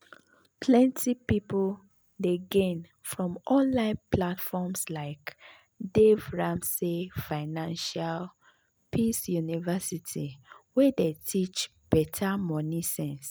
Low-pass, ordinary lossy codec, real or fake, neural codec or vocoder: none; none; real; none